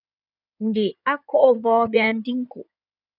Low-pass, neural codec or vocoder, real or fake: 5.4 kHz; codec, 16 kHz in and 24 kHz out, 2.2 kbps, FireRedTTS-2 codec; fake